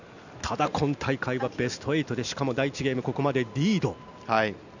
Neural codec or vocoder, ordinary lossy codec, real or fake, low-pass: none; none; real; 7.2 kHz